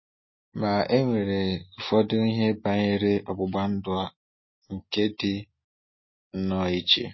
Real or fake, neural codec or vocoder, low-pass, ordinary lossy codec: real; none; 7.2 kHz; MP3, 24 kbps